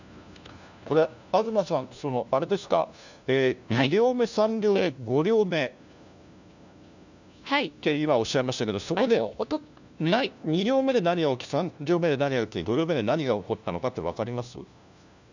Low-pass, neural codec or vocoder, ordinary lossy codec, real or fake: 7.2 kHz; codec, 16 kHz, 1 kbps, FunCodec, trained on LibriTTS, 50 frames a second; none; fake